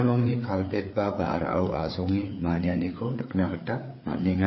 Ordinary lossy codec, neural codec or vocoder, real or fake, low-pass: MP3, 24 kbps; codec, 16 kHz, 4 kbps, FreqCodec, larger model; fake; 7.2 kHz